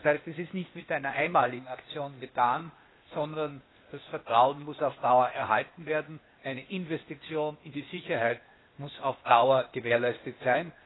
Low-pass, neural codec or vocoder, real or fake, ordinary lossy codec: 7.2 kHz; codec, 16 kHz, 0.8 kbps, ZipCodec; fake; AAC, 16 kbps